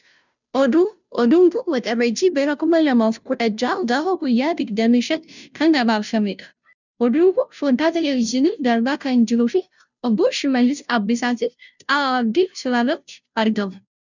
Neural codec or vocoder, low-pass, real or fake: codec, 16 kHz, 0.5 kbps, FunCodec, trained on Chinese and English, 25 frames a second; 7.2 kHz; fake